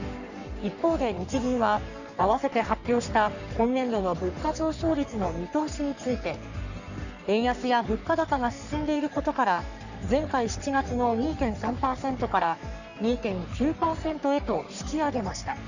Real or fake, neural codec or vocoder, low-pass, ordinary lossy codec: fake; codec, 44.1 kHz, 3.4 kbps, Pupu-Codec; 7.2 kHz; none